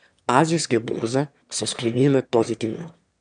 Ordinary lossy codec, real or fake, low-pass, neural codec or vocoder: none; fake; 9.9 kHz; autoencoder, 22.05 kHz, a latent of 192 numbers a frame, VITS, trained on one speaker